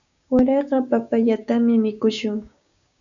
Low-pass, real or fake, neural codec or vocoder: 7.2 kHz; fake; codec, 16 kHz, 6 kbps, DAC